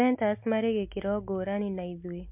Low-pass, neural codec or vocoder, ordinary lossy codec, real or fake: 3.6 kHz; none; MP3, 32 kbps; real